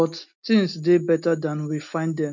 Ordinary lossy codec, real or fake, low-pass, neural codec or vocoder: none; real; 7.2 kHz; none